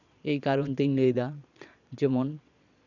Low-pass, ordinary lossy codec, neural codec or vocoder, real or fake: 7.2 kHz; none; vocoder, 22.05 kHz, 80 mel bands, Vocos; fake